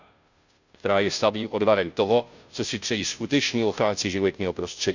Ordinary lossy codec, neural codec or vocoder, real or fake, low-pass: none; codec, 16 kHz, 0.5 kbps, FunCodec, trained on Chinese and English, 25 frames a second; fake; 7.2 kHz